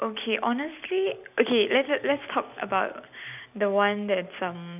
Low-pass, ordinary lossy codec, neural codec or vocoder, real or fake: 3.6 kHz; none; none; real